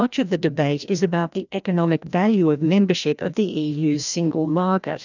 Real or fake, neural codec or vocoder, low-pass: fake; codec, 16 kHz, 1 kbps, FreqCodec, larger model; 7.2 kHz